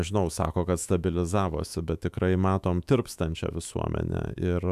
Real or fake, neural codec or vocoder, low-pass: fake; autoencoder, 48 kHz, 128 numbers a frame, DAC-VAE, trained on Japanese speech; 14.4 kHz